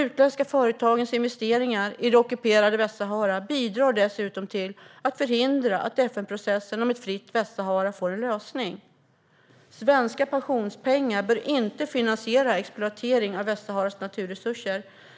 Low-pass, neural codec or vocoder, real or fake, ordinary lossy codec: none; none; real; none